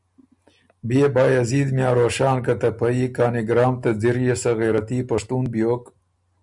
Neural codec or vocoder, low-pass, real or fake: none; 10.8 kHz; real